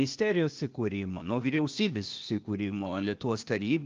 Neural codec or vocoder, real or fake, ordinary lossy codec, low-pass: codec, 16 kHz, 0.8 kbps, ZipCodec; fake; Opus, 24 kbps; 7.2 kHz